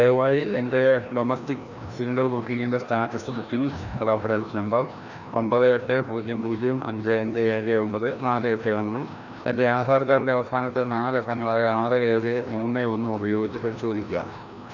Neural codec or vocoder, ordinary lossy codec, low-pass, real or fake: codec, 16 kHz, 1 kbps, FreqCodec, larger model; none; 7.2 kHz; fake